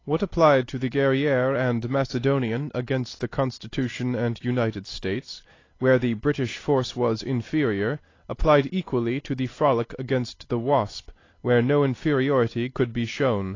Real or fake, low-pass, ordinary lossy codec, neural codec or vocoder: real; 7.2 kHz; AAC, 32 kbps; none